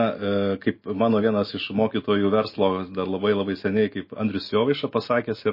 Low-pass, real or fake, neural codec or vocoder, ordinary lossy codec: 5.4 kHz; real; none; MP3, 24 kbps